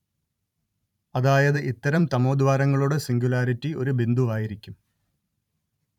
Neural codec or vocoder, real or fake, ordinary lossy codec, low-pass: vocoder, 44.1 kHz, 128 mel bands every 512 samples, BigVGAN v2; fake; none; 19.8 kHz